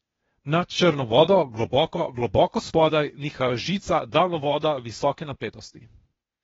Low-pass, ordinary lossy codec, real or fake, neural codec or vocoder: 7.2 kHz; AAC, 24 kbps; fake; codec, 16 kHz, 0.8 kbps, ZipCodec